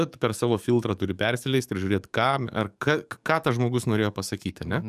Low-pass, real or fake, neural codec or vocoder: 14.4 kHz; fake; codec, 44.1 kHz, 7.8 kbps, DAC